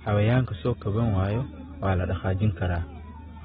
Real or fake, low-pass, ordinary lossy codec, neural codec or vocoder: real; 7.2 kHz; AAC, 16 kbps; none